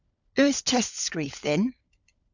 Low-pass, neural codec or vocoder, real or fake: 7.2 kHz; codec, 16 kHz, 16 kbps, FunCodec, trained on LibriTTS, 50 frames a second; fake